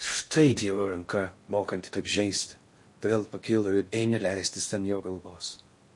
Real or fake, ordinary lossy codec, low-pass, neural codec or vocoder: fake; MP3, 48 kbps; 10.8 kHz; codec, 16 kHz in and 24 kHz out, 0.6 kbps, FocalCodec, streaming, 4096 codes